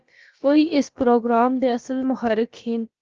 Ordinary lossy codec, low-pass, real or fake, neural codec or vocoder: Opus, 24 kbps; 7.2 kHz; fake; codec, 16 kHz, about 1 kbps, DyCAST, with the encoder's durations